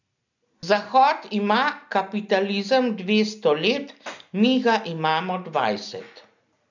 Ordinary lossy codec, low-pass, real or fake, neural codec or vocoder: none; 7.2 kHz; real; none